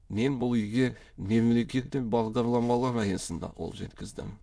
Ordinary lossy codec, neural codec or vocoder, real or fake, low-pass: none; autoencoder, 22.05 kHz, a latent of 192 numbers a frame, VITS, trained on many speakers; fake; none